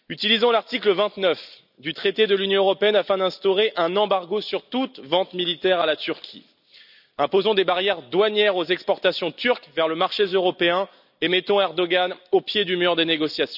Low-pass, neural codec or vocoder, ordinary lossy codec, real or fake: 5.4 kHz; none; none; real